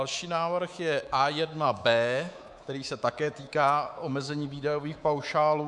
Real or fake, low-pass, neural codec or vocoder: fake; 10.8 kHz; codec, 24 kHz, 3.1 kbps, DualCodec